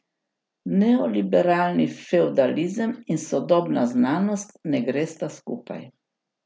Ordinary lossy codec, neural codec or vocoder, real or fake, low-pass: none; none; real; none